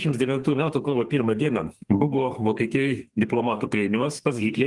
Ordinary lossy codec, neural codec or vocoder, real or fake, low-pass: Opus, 24 kbps; codec, 32 kHz, 1.9 kbps, SNAC; fake; 10.8 kHz